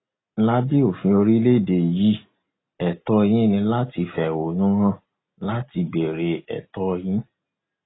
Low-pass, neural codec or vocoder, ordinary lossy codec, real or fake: 7.2 kHz; none; AAC, 16 kbps; real